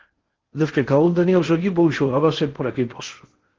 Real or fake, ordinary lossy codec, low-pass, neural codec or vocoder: fake; Opus, 16 kbps; 7.2 kHz; codec, 16 kHz in and 24 kHz out, 0.6 kbps, FocalCodec, streaming, 4096 codes